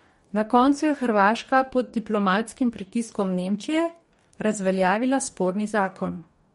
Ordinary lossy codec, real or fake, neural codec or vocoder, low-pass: MP3, 48 kbps; fake; codec, 44.1 kHz, 2.6 kbps, DAC; 19.8 kHz